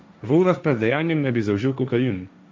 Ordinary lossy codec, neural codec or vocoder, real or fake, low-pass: none; codec, 16 kHz, 1.1 kbps, Voila-Tokenizer; fake; none